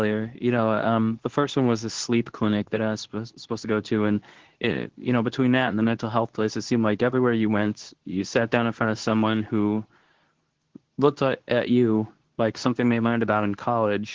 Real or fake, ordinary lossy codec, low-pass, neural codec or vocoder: fake; Opus, 16 kbps; 7.2 kHz; codec, 24 kHz, 0.9 kbps, WavTokenizer, medium speech release version 2